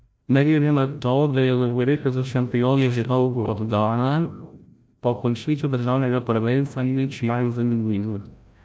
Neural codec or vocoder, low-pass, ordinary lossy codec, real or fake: codec, 16 kHz, 0.5 kbps, FreqCodec, larger model; none; none; fake